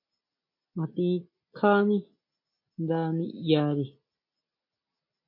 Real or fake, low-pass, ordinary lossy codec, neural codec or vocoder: real; 5.4 kHz; MP3, 32 kbps; none